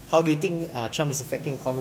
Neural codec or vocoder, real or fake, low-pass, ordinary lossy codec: codec, 44.1 kHz, 2.6 kbps, DAC; fake; 19.8 kHz; none